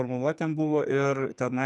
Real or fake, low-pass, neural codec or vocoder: fake; 10.8 kHz; codec, 44.1 kHz, 2.6 kbps, SNAC